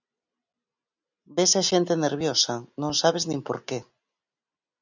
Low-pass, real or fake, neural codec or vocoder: 7.2 kHz; real; none